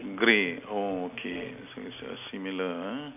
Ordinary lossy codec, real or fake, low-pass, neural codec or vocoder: none; real; 3.6 kHz; none